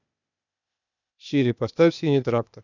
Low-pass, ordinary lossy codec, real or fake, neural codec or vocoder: 7.2 kHz; none; fake; codec, 16 kHz, 0.8 kbps, ZipCodec